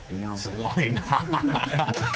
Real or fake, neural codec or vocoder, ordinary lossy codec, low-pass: fake; codec, 16 kHz, 4 kbps, X-Codec, HuBERT features, trained on general audio; none; none